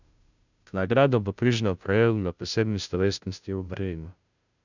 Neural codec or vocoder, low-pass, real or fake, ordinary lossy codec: codec, 16 kHz, 0.5 kbps, FunCodec, trained on Chinese and English, 25 frames a second; 7.2 kHz; fake; none